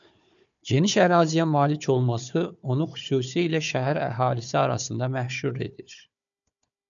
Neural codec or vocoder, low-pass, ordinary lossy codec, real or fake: codec, 16 kHz, 4 kbps, FunCodec, trained on Chinese and English, 50 frames a second; 7.2 kHz; MP3, 96 kbps; fake